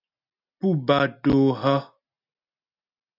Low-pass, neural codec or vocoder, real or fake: 5.4 kHz; none; real